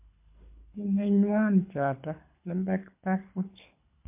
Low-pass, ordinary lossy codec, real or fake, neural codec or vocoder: 3.6 kHz; MP3, 32 kbps; fake; codec, 24 kHz, 6 kbps, HILCodec